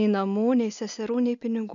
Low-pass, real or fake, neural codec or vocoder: 7.2 kHz; real; none